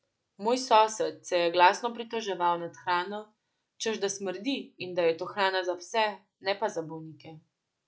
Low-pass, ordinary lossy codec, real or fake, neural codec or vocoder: none; none; real; none